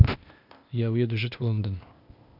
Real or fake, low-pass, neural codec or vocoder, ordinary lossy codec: fake; 5.4 kHz; codec, 16 kHz, 0.8 kbps, ZipCodec; none